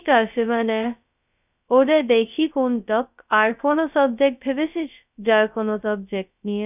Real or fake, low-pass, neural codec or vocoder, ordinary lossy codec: fake; 3.6 kHz; codec, 16 kHz, 0.2 kbps, FocalCodec; none